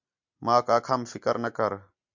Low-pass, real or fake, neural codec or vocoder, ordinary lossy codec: 7.2 kHz; real; none; MP3, 64 kbps